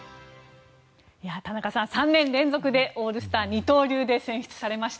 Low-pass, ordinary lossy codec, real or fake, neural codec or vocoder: none; none; real; none